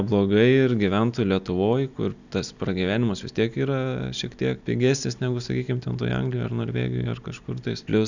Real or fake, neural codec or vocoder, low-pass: real; none; 7.2 kHz